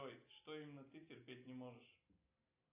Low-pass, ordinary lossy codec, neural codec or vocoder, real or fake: 3.6 kHz; AAC, 24 kbps; none; real